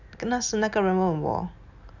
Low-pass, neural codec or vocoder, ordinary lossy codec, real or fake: 7.2 kHz; none; none; real